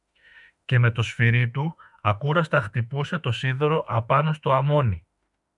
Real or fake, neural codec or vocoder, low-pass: fake; autoencoder, 48 kHz, 32 numbers a frame, DAC-VAE, trained on Japanese speech; 10.8 kHz